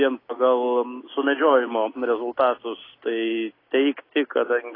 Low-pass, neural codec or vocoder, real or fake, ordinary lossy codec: 5.4 kHz; none; real; AAC, 24 kbps